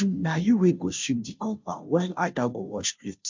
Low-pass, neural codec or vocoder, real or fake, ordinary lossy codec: 7.2 kHz; codec, 16 kHz, 0.5 kbps, FunCodec, trained on Chinese and English, 25 frames a second; fake; MP3, 64 kbps